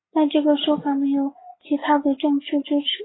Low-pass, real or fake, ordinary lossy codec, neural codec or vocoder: 7.2 kHz; real; AAC, 16 kbps; none